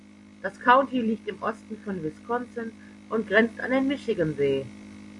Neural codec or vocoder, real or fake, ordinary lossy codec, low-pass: none; real; MP3, 48 kbps; 10.8 kHz